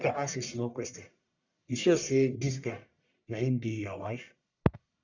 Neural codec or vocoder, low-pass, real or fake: codec, 44.1 kHz, 1.7 kbps, Pupu-Codec; 7.2 kHz; fake